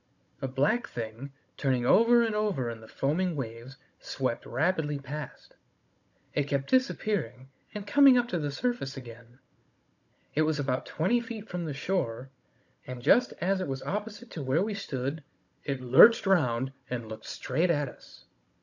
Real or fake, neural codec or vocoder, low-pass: fake; codec, 16 kHz, 16 kbps, FunCodec, trained on Chinese and English, 50 frames a second; 7.2 kHz